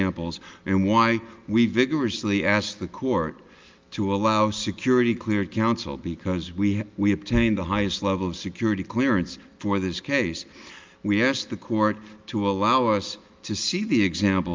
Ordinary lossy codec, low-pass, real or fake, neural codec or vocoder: Opus, 24 kbps; 7.2 kHz; real; none